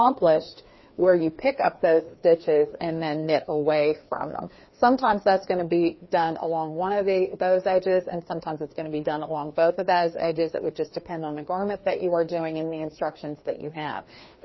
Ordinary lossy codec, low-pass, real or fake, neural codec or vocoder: MP3, 24 kbps; 7.2 kHz; fake; codec, 16 kHz, 2 kbps, FreqCodec, larger model